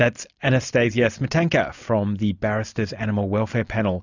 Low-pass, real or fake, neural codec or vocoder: 7.2 kHz; real; none